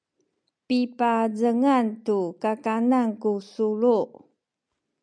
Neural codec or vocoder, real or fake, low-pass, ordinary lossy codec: none; real; 9.9 kHz; MP3, 96 kbps